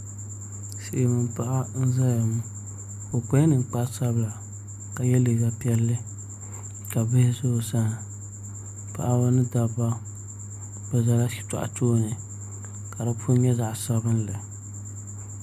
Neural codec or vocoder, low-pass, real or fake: none; 14.4 kHz; real